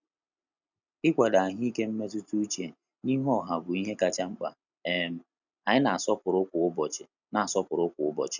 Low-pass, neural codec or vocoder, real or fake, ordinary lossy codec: 7.2 kHz; none; real; none